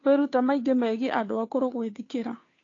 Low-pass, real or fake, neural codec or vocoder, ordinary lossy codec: 7.2 kHz; fake; codec, 16 kHz, 2 kbps, FunCodec, trained on Chinese and English, 25 frames a second; AAC, 32 kbps